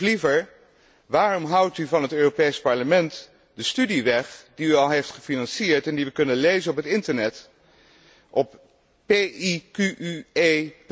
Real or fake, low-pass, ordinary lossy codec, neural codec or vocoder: real; none; none; none